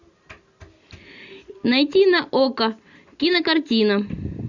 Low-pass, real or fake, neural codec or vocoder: 7.2 kHz; real; none